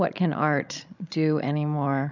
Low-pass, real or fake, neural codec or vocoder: 7.2 kHz; fake; codec, 16 kHz, 16 kbps, FreqCodec, larger model